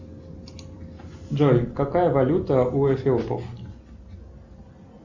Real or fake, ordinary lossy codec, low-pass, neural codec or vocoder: real; Opus, 64 kbps; 7.2 kHz; none